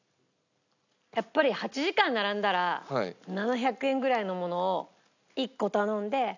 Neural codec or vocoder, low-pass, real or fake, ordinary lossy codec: none; 7.2 kHz; real; none